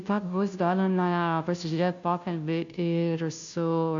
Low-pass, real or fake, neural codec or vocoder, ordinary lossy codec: 7.2 kHz; fake; codec, 16 kHz, 0.5 kbps, FunCodec, trained on Chinese and English, 25 frames a second; MP3, 64 kbps